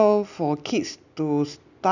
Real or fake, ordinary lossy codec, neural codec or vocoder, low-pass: real; none; none; 7.2 kHz